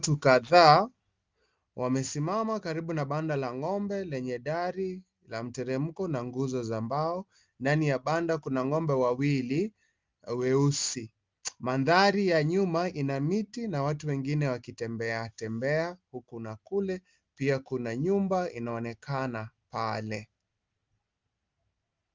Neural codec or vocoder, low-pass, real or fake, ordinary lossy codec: none; 7.2 kHz; real; Opus, 32 kbps